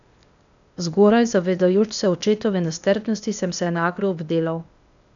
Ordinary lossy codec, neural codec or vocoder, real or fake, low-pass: none; codec, 16 kHz, 0.8 kbps, ZipCodec; fake; 7.2 kHz